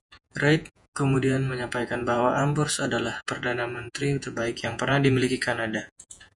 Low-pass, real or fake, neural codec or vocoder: 10.8 kHz; fake; vocoder, 48 kHz, 128 mel bands, Vocos